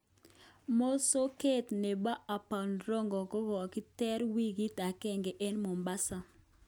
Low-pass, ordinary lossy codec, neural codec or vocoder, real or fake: none; none; none; real